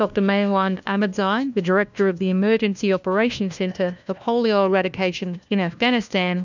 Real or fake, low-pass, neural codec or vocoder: fake; 7.2 kHz; codec, 16 kHz, 1 kbps, FunCodec, trained on LibriTTS, 50 frames a second